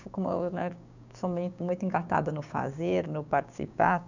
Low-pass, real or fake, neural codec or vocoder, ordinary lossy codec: 7.2 kHz; fake; codec, 16 kHz, 6 kbps, DAC; none